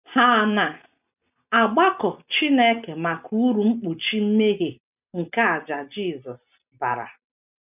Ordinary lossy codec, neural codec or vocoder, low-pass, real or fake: none; none; 3.6 kHz; real